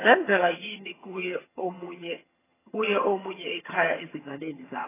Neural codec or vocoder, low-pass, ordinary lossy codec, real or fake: vocoder, 22.05 kHz, 80 mel bands, HiFi-GAN; 3.6 kHz; AAC, 16 kbps; fake